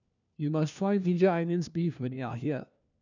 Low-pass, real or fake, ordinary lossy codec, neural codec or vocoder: 7.2 kHz; fake; none; codec, 16 kHz, 1 kbps, FunCodec, trained on LibriTTS, 50 frames a second